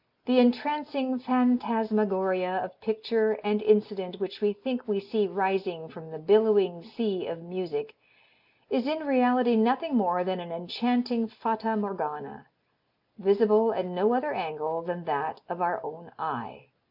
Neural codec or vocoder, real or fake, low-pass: none; real; 5.4 kHz